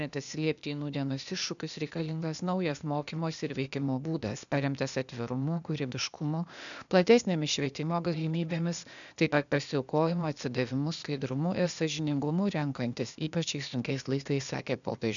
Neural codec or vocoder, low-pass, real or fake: codec, 16 kHz, 0.8 kbps, ZipCodec; 7.2 kHz; fake